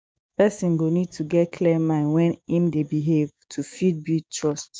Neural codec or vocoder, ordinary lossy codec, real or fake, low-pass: codec, 16 kHz, 6 kbps, DAC; none; fake; none